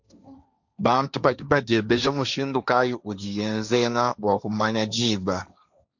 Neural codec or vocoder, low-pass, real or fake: codec, 16 kHz, 1.1 kbps, Voila-Tokenizer; 7.2 kHz; fake